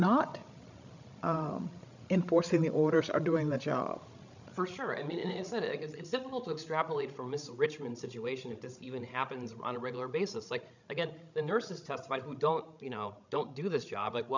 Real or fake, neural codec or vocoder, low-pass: fake; codec, 16 kHz, 16 kbps, FreqCodec, larger model; 7.2 kHz